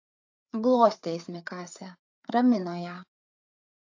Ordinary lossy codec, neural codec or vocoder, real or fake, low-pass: AAC, 48 kbps; codec, 16 kHz, 4 kbps, FreqCodec, larger model; fake; 7.2 kHz